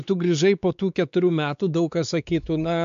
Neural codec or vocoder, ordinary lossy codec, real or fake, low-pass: codec, 16 kHz, 4 kbps, X-Codec, WavLM features, trained on Multilingual LibriSpeech; AAC, 96 kbps; fake; 7.2 kHz